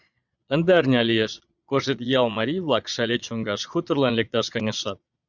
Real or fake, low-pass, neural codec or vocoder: fake; 7.2 kHz; vocoder, 22.05 kHz, 80 mel bands, Vocos